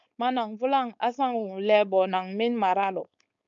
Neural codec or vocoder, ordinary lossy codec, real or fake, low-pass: codec, 16 kHz, 4.8 kbps, FACodec; MP3, 64 kbps; fake; 7.2 kHz